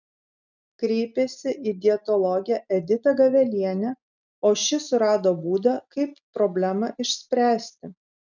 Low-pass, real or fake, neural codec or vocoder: 7.2 kHz; real; none